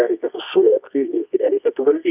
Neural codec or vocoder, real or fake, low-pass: codec, 24 kHz, 0.9 kbps, WavTokenizer, medium music audio release; fake; 3.6 kHz